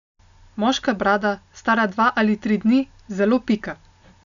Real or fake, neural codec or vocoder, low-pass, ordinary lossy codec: real; none; 7.2 kHz; none